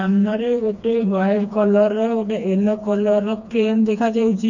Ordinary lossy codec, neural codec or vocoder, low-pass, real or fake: none; codec, 16 kHz, 2 kbps, FreqCodec, smaller model; 7.2 kHz; fake